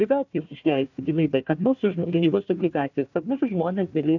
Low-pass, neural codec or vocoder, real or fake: 7.2 kHz; codec, 16 kHz, 1 kbps, FunCodec, trained on Chinese and English, 50 frames a second; fake